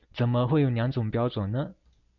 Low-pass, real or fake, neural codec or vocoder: 7.2 kHz; real; none